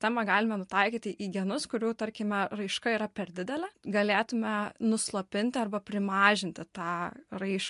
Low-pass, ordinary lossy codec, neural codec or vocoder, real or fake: 10.8 kHz; MP3, 64 kbps; none; real